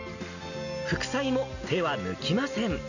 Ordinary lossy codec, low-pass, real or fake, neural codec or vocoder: none; 7.2 kHz; real; none